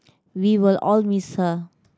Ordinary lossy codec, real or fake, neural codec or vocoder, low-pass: none; real; none; none